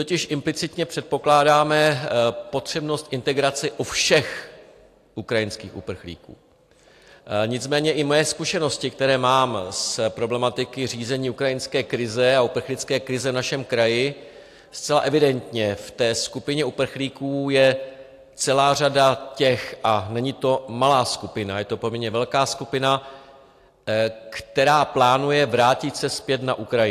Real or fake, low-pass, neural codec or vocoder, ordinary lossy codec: real; 14.4 kHz; none; AAC, 64 kbps